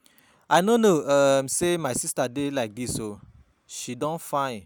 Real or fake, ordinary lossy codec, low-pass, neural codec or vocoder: real; none; none; none